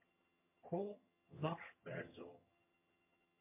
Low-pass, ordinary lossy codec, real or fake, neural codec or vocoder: 3.6 kHz; MP3, 32 kbps; fake; vocoder, 22.05 kHz, 80 mel bands, HiFi-GAN